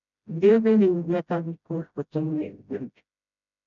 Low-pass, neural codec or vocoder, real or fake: 7.2 kHz; codec, 16 kHz, 0.5 kbps, FreqCodec, smaller model; fake